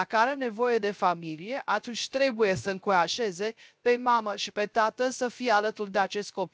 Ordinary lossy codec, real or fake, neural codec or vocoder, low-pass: none; fake; codec, 16 kHz, 0.3 kbps, FocalCodec; none